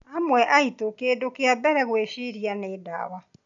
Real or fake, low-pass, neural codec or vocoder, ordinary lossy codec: real; 7.2 kHz; none; none